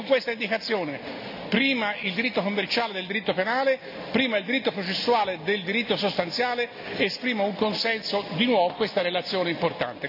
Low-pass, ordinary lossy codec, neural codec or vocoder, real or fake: 5.4 kHz; none; none; real